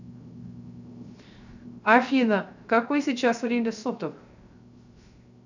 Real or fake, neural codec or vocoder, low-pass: fake; codec, 16 kHz, 0.3 kbps, FocalCodec; 7.2 kHz